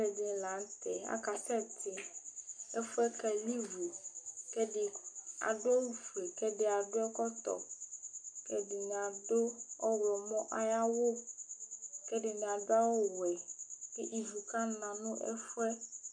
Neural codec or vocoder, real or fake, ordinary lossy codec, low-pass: none; real; MP3, 64 kbps; 9.9 kHz